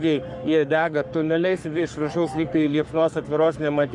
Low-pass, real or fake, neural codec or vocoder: 10.8 kHz; fake; codec, 44.1 kHz, 3.4 kbps, Pupu-Codec